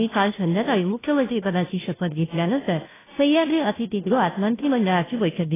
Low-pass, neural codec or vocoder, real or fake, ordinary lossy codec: 3.6 kHz; codec, 16 kHz, 0.5 kbps, FunCodec, trained on Chinese and English, 25 frames a second; fake; AAC, 16 kbps